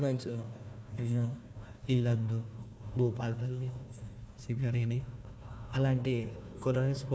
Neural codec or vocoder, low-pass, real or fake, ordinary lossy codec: codec, 16 kHz, 1 kbps, FunCodec, trained on Chinese and English, 50 frames a second; none; fake; none